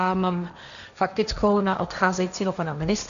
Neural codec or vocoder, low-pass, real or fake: codec, 16 kHz, 1.1 kbps, Voila-Tokenizer; 7.2 kHz; fake